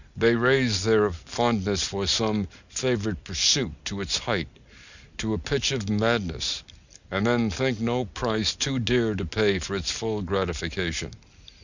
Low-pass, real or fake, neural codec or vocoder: 7.2 kHz; real; none